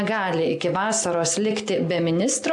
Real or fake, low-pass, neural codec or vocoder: real; 10.8 kHz; none